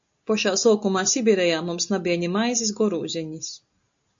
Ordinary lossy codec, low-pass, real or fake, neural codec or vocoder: AAC, 64 kbps; 7.2 kHz; real; none